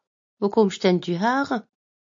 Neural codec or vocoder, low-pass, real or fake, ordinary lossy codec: none; 7.2 kHz; real; MP3, 48 kbps